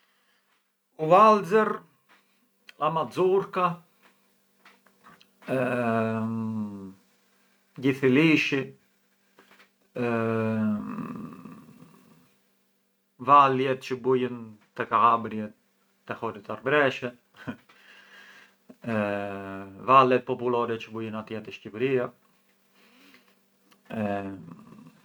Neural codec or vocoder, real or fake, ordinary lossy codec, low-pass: none; real; none; none